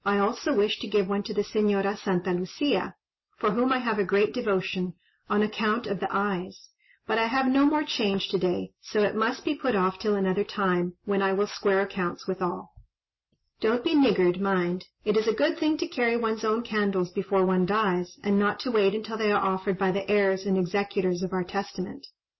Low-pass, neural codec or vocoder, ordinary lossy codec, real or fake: 7.2 kHz; none; MP3, 24 kbps; real